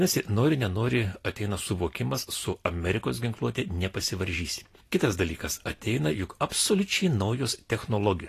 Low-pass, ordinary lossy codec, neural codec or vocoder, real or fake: 14.4 kHz; AAC, 48 kbps; none; real